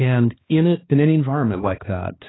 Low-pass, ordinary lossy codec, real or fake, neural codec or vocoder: 7.2 kHz; AAC, 16 kbps; fake; codec, 16 kHz, 2 kbps, X-Codec, HuBERT features, trained on balanced general audio